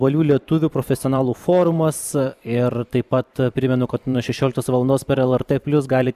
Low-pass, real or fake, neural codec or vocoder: 14.4 kHz; fake; vocoder, 44.1 kHz, 128 mel bands every 512 samples, BigVGAN v2